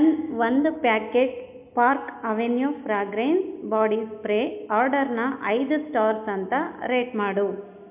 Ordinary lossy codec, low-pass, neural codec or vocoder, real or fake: MP3, 32 kbps; 3.6 kHz; none; real